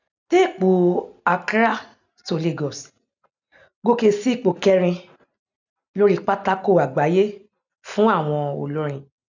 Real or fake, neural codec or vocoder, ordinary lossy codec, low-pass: real; none; none; 7.2 kHz